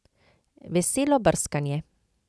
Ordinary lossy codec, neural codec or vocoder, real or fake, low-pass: none; none; real; none